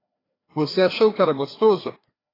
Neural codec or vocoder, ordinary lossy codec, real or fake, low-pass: codec, 16 kHz, 2 kbps, FreqCodec, larger model; AAC, 24 kbps; fake; 5.4 kHz